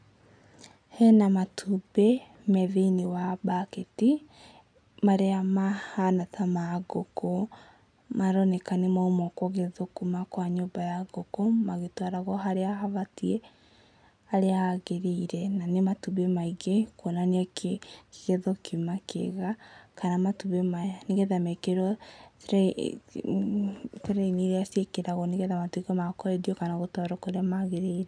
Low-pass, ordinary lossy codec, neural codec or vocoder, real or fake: 9.9 kHz; none; none; real